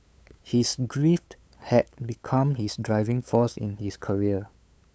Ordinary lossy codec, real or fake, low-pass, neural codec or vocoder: none; fake; none; codec, 16 kHz, 8 kbps, FunCodec, trained on LibriTTS, 25 frames a second